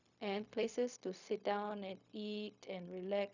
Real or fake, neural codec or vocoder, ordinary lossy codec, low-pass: fake; codec, 16 kHz, 0.4 kbps, LongCat-Audio-Codec; none; 7.2 kHz